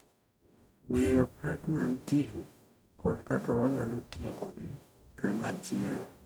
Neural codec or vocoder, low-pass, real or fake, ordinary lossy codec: codec, 44.1 kHz, 0.9 kbps, DAC; none; fake; none